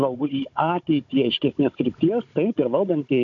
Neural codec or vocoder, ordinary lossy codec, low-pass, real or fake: codec, 16 kHz, 16 kbps, FunCodec, trained on Chinese and English, 50 frames a second; AAC, 48 kbps; 7.2 kHz; fake